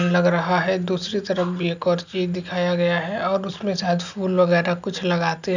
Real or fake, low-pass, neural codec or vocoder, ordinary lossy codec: real; 7.2 kHz; none; none